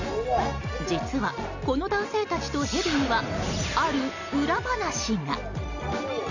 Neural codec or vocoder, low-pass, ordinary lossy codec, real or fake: none; 7.2 kHz; none; real